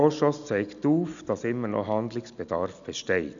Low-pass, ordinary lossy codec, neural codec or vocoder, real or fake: 7.2 kHz; none; none; real